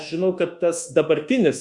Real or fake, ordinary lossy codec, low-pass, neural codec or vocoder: fake; Opus, 64 kbps; 10.8 kHz; codec, 24 kHz, 1.2 kbps, DualCodec